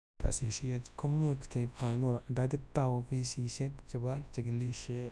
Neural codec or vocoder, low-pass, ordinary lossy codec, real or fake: codec, 24 kHz, 0.9 kbps, WavTokenizer, large speech release; none; none; fake